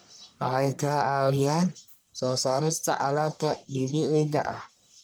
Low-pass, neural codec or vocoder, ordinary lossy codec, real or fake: none; codec, 44.1 kHz, 1.7 kbps, Pupu-Codec; none; fake